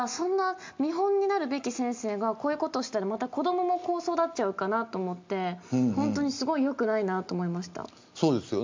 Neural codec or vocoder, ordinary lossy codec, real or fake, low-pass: none; MP3, 64 kbps; real; 7.2 kHz